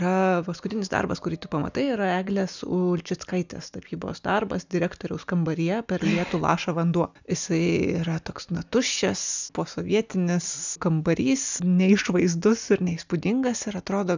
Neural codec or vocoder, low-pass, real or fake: none; 7.2 kHz; real